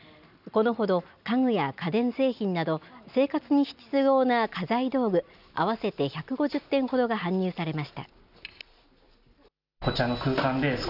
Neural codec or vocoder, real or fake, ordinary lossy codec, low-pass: none; real; none; 5.4 kHz